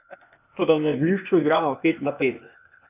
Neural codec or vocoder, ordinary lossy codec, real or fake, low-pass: codec, 16 kHz, 0.8 kbps, ZipCodec; none; fake; 3.6 kHz